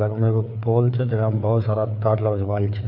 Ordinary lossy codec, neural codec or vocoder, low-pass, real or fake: none; codec, 16 kHz, 4 kbps, FreqCodec, larger model; 5.4 kHz; fake